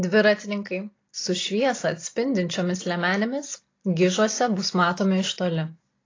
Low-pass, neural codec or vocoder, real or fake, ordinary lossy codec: 7.2 kHz; none; real; AAC, 32 kbps